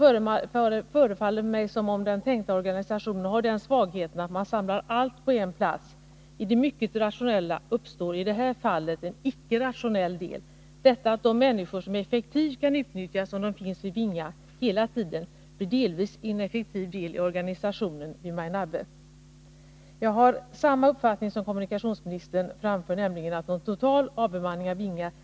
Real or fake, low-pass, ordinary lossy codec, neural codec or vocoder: real; none; none; none